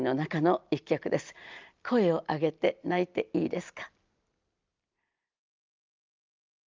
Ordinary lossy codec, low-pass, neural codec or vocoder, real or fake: Opus, 24 kbps; 7.2 kHz; none; real